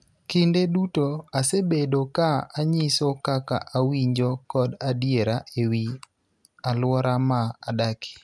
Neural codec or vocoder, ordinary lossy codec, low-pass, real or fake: none; none; none; real